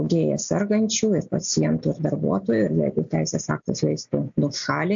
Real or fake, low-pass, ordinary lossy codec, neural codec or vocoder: real; 7.2 kHz; AAC, 64 kbps; none